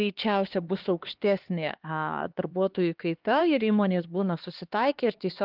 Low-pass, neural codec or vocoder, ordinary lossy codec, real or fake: 5.4 kHz; codec, 16 kHz, 2 kbps, X-Codec, HuBERT features, trained on LibriSpeech; Opus, 32 kbps; fake